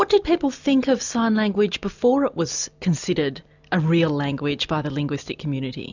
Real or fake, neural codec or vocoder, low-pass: real; none; 7.2 kHz